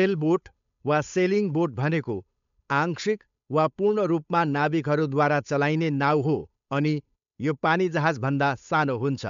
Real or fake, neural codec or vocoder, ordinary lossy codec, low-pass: fake; codec, 16 kHz, 8 kbps, FunCodec, trained on Chinese and English, 25 frames a second; MP3, 64 kbps; 7.2 kHz